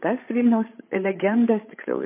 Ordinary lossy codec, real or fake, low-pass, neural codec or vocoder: MP3, 24 kbps; fake; 3.6 kHz; codec, 16 kHz, 8 kbps, FreqCodec, larger model